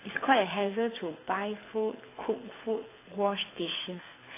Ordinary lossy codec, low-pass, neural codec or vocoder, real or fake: AAC, 16 kbps; 3.6 kHz; codec, 24 kHz, 6 kbps, HILCodec; fake